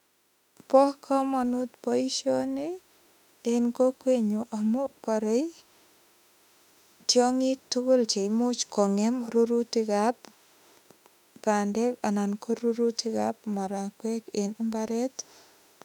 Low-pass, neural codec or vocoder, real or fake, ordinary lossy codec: 19.8 kHz; autoencoder, 48 kHz, 32 numbers a frame, DAC-VAE, trained on Japanese speech; fake; none